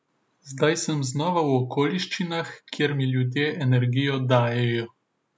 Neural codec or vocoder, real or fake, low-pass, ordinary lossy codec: none; real; none; none